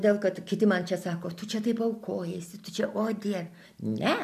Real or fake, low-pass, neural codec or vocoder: real; 14.4 kHz; none